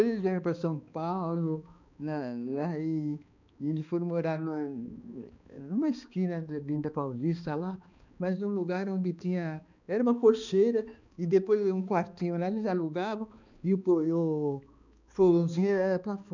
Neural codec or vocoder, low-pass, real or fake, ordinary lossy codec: codec, 16 kHz, 2 kbps, X-Codec, HuBERT features, trained on balanced general audio; 7.2 kHz; fake; none